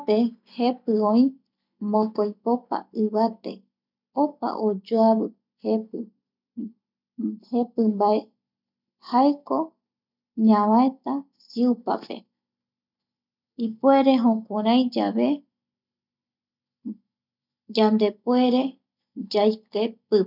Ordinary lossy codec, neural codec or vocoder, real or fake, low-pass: none; none; real; 5.4 kHz